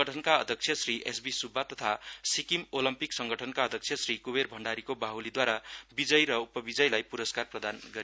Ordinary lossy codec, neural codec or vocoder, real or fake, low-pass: none; none; real; none